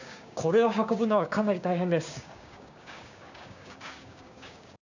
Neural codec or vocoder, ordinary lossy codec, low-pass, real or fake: codec, 16 kHz, 6 kbps, DAC; none; 7.2 kHz; fake